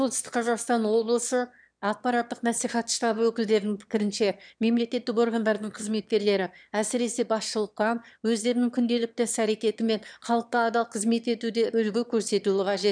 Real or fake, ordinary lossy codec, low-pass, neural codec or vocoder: fake; none; 9.9 kHz; autoencoder, 22.05 kHz, a latent of 192 numbers a frame, VITS, trained on one speaker